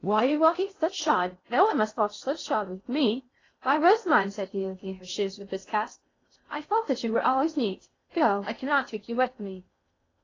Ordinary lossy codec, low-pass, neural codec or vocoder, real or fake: AAC, 32 kbps; 7.2 kHz; codec, 16 kHz in and 24 kHz out, 0.6 kbps, FocalCodec, streaming, 2048 codes; fake